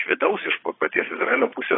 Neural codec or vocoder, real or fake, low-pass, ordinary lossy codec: vocoder, 22.05 kHz, 80 mel bands, Vocos; fake; 7.2 kHz; AAC, 16 kbps